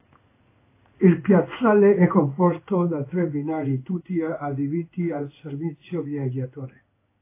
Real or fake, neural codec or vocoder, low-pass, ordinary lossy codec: fake; codec, 16 kHz in and 24 kHz out, 1 kbps, XY-Tokenizer; 3.6 kHz; AAC, 24 kbps